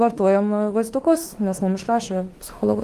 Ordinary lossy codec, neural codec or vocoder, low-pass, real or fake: Opus, 24 kbps; autoencoder, 48 kHz, 32 numbers a frame, DAC-VAE, trained on Japanese speech; 14.4 kHz; fake